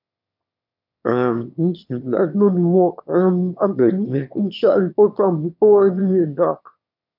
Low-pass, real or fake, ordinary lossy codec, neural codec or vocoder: 5.4 kHz; fake; none; autoencoder, 22.05 kHz, a latent of 192 numbers a frame, VITS, trained on one speaker